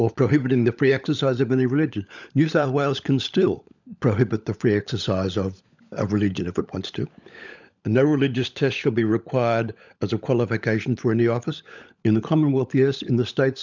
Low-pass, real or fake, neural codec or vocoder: 7.2 kHz; fake; codec, 16 kHz, 16 kbps, FunCodec, trained on LibriTTS, 50 frames a second